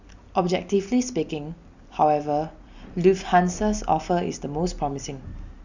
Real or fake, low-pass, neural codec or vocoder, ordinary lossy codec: real; 7.2 kHz; none; Opus, 64 kbps